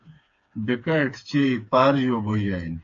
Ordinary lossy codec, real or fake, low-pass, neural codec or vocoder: AAC, 48 kbps; fake; 7.2 kHz; codec, 16 kHz, 4 kbps, FreqCodec, smaller model